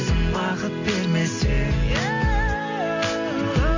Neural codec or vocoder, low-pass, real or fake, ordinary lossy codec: none; 7.2 kHz; real; AAC, 48 kbps